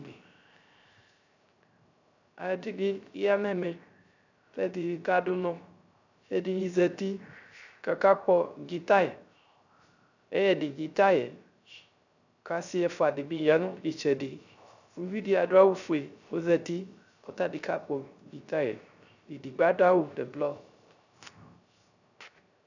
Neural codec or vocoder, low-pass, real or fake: codec, 16 kHz, 0.3 kbps, FocalCodec; 7.2 kHz; fake